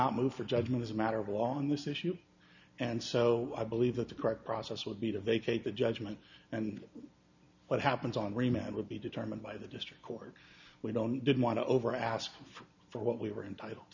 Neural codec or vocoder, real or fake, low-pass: none; real; 7.2 kHz